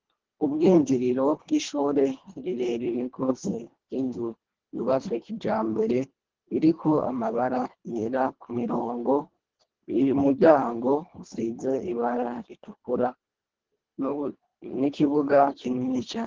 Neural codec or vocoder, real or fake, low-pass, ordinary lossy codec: codec, 24 kHz, 1.5 kbps, HILCodec; fake; 7.2 kHz; Opus, 16 kbps